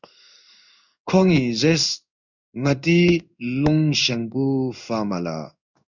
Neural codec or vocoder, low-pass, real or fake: codec, 16 kHz in and 24 kHz out, 1 kbps, XY-Tokenizer; 7.2 kHz; fake